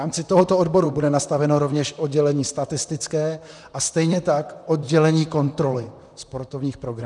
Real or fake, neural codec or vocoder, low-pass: fake; vocoder, 44.1 kHz, 128 mel bands, Pupu-Vocoder; 10.8 kHz